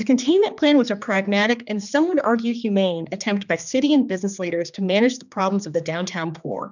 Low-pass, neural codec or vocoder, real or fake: 7.2 kHz; codec, 16 kHz, 2 kbps, X-Codec, HuBERT features, trained on general audio; fake